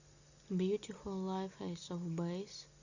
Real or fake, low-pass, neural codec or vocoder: real; 7.2 kHz; none